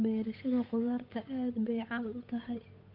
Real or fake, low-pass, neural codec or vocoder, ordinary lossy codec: fake; 5.4 kHz; codec, 16 kHz, 8 kbps, FunCodec, trained on Chinese and English, 25 frames a second; none